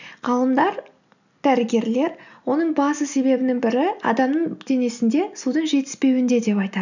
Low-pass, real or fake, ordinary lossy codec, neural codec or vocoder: 7.2 kHz; real; none; none